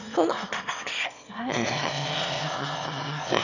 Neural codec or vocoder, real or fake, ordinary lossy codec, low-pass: autoencoder, 22.05 kHz, a latent of 192 numbers a frame, VITS, trained on one speaker; fake; none; 7.2 kHz